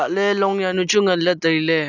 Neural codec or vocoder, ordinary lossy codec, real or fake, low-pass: none; none; real; 7.2 kHz